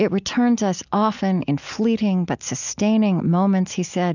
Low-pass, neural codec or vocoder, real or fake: 7.2 kHz; none; real